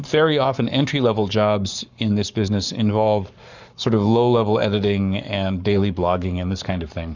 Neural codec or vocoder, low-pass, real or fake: codec, 44.1 kHz, 7.8 kbps, Pupu-Codec; 7.2 kHz; fake